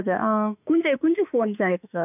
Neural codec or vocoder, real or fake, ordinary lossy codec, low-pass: autoencoder, 48 kHz, 32 numbers a frame, DAC-VAE, trained on Japanese speech; fake; none; 3.6 kHz